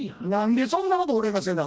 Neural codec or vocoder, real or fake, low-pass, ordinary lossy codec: codec, 16 kHz, 1 kbps, FreqCodec, smaller model; fake; none; none